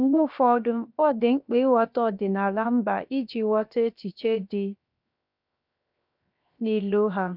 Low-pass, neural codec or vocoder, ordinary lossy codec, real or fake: 5.4 kHz; codec, 16 kHz, 0.7 kbps, FocalCodec; none; fake